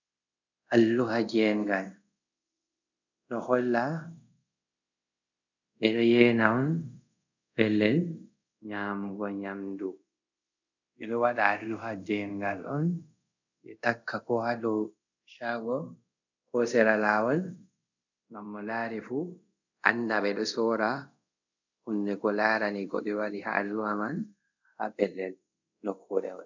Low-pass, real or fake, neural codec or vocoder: 7.2 kHz; fake; codec, 24 kHz, 0.5 kbps, DualCodec